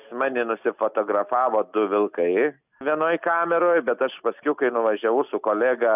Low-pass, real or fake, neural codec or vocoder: 3.6 kHz; real; none